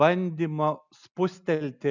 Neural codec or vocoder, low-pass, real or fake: none; 7.2 kHz; real